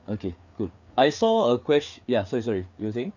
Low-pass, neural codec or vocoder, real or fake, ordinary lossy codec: 7.2 kHz; codec, 16 kHz, 4 kbps, FreqCodec, larger model; fake; none